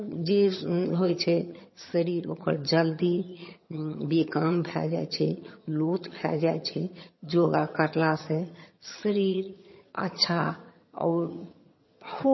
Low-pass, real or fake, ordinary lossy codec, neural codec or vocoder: 7.2 kHz; fake; MP3, 24 kbps; vocoder, 22.05 kHz, 80 mel bands, HiFi-GAN